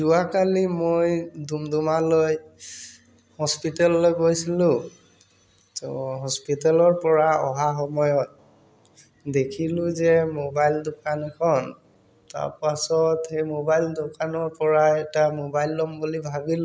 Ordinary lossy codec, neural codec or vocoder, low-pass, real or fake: none; none; none; real